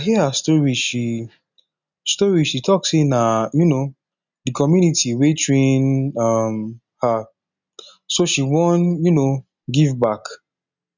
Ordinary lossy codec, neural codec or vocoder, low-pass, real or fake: none; none; 7.2 kHz; real